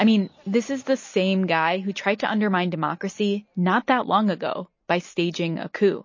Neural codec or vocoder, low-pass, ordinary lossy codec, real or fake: none; 7.2 kHz; MP3, 32 kbps; real